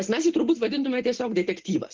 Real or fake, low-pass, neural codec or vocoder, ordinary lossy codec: real; 7.2 kHz; none; Opus, 16 kbps